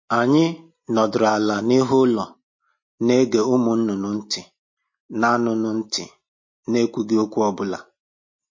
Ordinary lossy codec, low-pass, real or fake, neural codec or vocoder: MP3, 32 kbps; 7.2 kHz; real; none